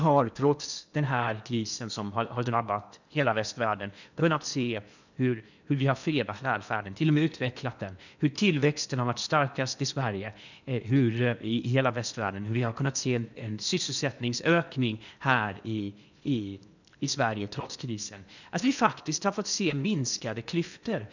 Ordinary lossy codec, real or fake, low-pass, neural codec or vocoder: none; fake; 7.2 kHz; codec, 16 kHz in and 24 kHz out, 0.8 kbps, FocalCodec, streaming, 65536 codes